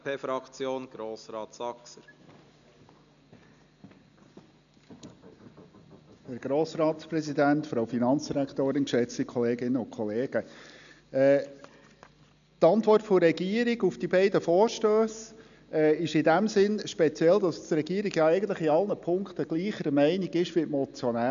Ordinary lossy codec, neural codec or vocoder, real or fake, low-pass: none; none; real; 7.2 kHz